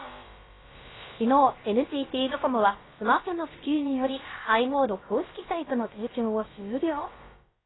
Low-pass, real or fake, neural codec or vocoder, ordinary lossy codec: 7.2 kHz; fake; codec, 16 kHz, about 1 kbps, DyCAST, with the encoder's durations; AAC, 16 kbps